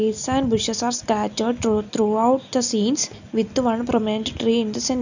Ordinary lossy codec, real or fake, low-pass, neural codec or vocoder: none; real; 7.2 kHz; none